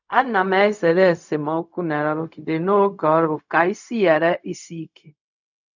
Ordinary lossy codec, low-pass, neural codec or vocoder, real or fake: none; 7.2 kHz; codec, 16 kHz, 0.4 kbps, LongCat-Audio-Codec; fake